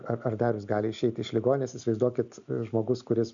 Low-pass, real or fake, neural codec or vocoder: 7.2 kHz; real; none